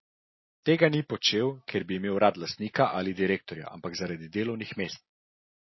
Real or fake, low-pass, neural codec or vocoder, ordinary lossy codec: real; 7.2 kHz; none; MP3, 24 kbps